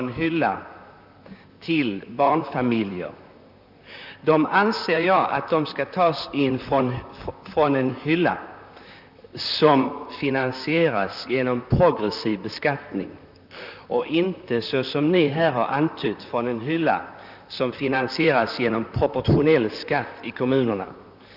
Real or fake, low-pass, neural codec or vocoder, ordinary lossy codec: fake; 5.4 kHz; vocoder, 44.1 kHz, 128 mel bands, Pupu-Vocoder; none